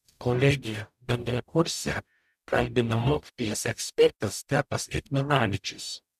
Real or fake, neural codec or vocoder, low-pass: fake; codec, 44.1 kHz, 0.9 kbps, DAC; 14.4 kHz